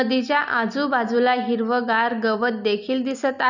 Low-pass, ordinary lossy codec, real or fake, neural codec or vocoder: 7.2 kHz; none; real; none